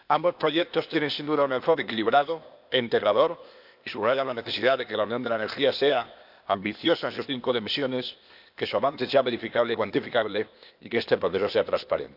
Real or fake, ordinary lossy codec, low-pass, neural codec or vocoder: fake; none; 5.4 kHz; codec, 16 kHz, 0.8 kbps, ZipCodec